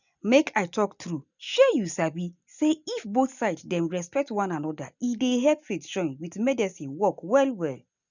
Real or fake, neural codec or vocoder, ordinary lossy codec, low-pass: real; none; none; 7.2 kHz